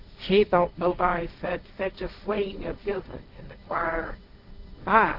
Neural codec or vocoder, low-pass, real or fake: codec, 16 kHz, 1.1 kbps, Voila-Tokenizer; 5.4 kHz; fake